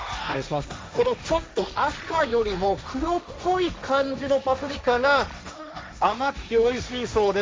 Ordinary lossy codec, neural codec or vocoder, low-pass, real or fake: none; codec, 16 kHz, 1.1 kbps, Voila-Tokenizer; none; fake